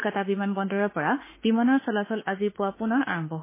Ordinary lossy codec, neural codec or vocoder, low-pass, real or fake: MP3, 16 kbps; autoencoder, 48 kHz, 32 numbers a frame, DAC-VAE, trained on Japanese speech; 3.6 kHz; fake